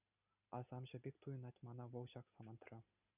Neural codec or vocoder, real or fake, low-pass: none; real; 3.6 kHz